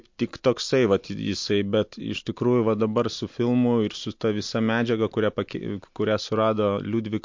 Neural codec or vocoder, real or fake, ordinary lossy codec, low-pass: none; real; MP3, 48 kbps; 7.2 kHz